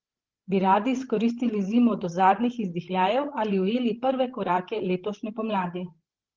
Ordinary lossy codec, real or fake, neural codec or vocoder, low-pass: Opus, 16 kbps; fake; codec, 16 kHz, 16 kbps, FreqCodec, larger model; 7.2 kHz